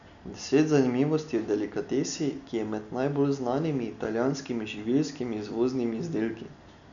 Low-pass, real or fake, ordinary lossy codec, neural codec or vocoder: 7.2 kHz; real; none; none